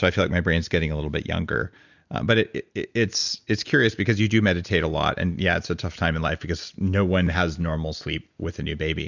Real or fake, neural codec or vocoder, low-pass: real; none; 7.2 kHz